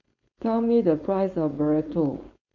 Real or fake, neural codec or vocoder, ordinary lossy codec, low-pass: fake; codec, 16 kHz, 4.8 kbps, FACodec; none; 7.2 kHz